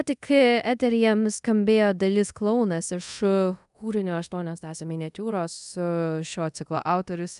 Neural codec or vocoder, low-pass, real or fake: codec, 24 kHz, 0.5 kbps, DualCodec; 10.8 kHz; fake